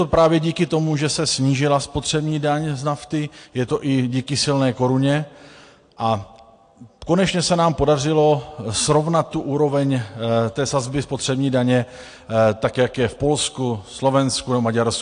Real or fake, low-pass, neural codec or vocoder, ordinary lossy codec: real; 9.9 kHz; none; AAC, 48 kbps